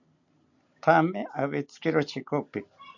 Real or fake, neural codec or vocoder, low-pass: real; none; 7.2 kHz